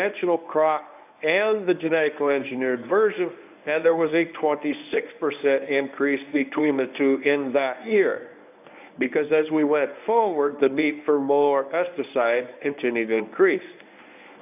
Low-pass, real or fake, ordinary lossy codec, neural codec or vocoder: 3.6 kHz; fake; AAC, 32 kbps; codec, 24 kHz, 0.9 kbps, WavTokenizer, medium speech release version 1